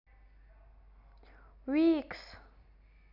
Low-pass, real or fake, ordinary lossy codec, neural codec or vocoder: 5.4 kHz; real; none; none